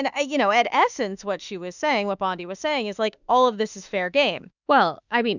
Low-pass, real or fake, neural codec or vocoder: 7.2 kHz; fake; autoencoder, 48 kHz, 32 numbers a frame, DAC-VAE, trained on Japanese speech